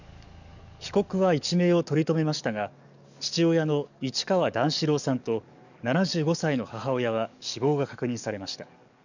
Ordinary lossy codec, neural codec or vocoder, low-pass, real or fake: none; codec, 44.1 kHz, 7.8 kbps, DAC; 7.2 kHz; fake